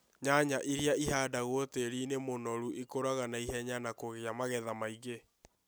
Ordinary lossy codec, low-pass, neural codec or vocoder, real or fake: none; none; none; real